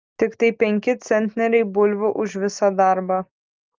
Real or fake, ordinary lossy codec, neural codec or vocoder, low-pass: real; Opus, 32 kbps; none; 7.2 kHz